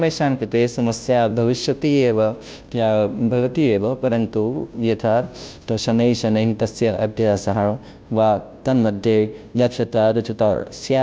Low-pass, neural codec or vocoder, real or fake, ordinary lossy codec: none; codec, 16 kHz, 0.5 kbps, FunCodec, trained on Chinese and English, 25 frames a second; fake; none